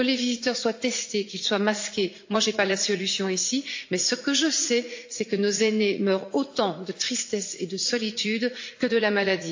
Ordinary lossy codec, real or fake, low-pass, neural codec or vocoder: AAC, 48 kbps; fake; 7.2 kHz; vocoder, 44.1 kHz, 80 mel bands, Vocos